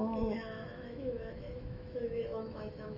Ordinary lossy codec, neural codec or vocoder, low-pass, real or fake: none; autoencoder, 48 kHz, 128 numbers a frame, DAC-VAE, trained on Japanese speech; 5.4 kHz; fake